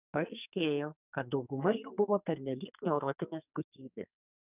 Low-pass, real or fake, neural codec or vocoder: 3.6 kHz; fake; codec, 44.1 kHz, 2.6 kbps, SNAC